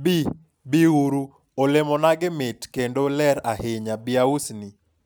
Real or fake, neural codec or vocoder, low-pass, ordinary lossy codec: real; none; none; none